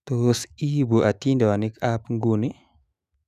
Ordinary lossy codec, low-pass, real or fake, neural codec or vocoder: none; 14.4 kHz; fake; autoencoder, 48 kHz, 128 numbers a frame, DAC-VAE, trained on Japanese speech